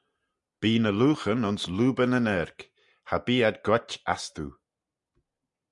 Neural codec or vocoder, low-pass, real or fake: none; 10.8 kHz; real